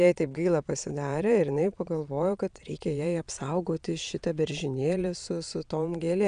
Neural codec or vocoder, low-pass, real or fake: vocoder, 22.05 kHz, 80 mel bands, Vocos; 9.9 kHz; fake